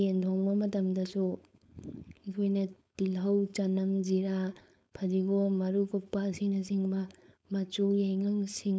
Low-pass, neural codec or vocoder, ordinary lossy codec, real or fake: none; codec, 16 kHz, 4.8 kbps, FACodec; none; fake